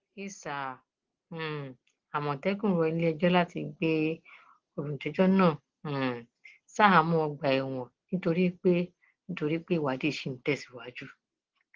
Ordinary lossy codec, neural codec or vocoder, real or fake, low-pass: Opus, 16 kbps; none; real; 7.2 kHz